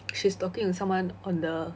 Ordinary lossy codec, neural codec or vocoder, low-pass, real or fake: none; none; none; real